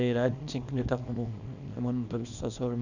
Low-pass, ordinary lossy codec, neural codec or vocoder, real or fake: 7.2 kHz; none; codec, 24 kHz, 0.9 kbps, WavTokenizer, small release; fake